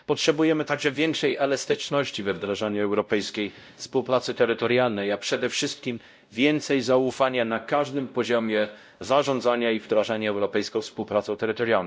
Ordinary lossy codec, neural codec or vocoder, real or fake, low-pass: none; codec, 16 kHz, 0.5 kbps, X-Codec, WavLM features, trained on Multilingual LibriSpeech; fake; none